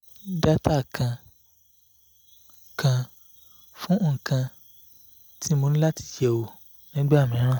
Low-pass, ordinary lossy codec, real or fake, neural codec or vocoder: none; none; real; none